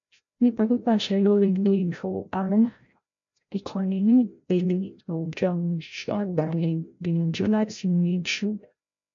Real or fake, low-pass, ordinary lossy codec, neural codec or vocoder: fake; 7.2 kHz; MP3, 48 kbps; codec, 16 kHz, 0.5 kbps, FreqCodec, larger model